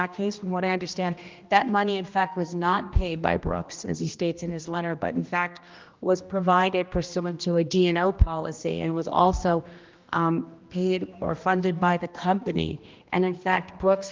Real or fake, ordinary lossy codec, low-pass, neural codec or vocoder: fake; Opus, 24 kbps; 7.2 kHz; codec, 16 kHz, 1 kbps, X-Codec, HuBERT features, trained on general audio